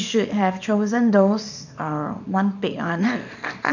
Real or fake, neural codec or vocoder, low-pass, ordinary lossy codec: fake; codec, 24 kHz, 0.9 kbps, WavTokenizer, small release; 7.2 kHz; none